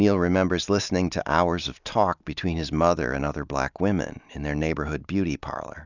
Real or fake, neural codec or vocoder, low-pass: real; none; 7.2 kHz